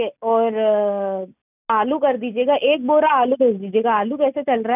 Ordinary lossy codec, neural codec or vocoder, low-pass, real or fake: none; none; 3.6 kHz; real